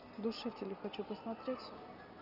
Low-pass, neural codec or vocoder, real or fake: 5.4 kHz; none; real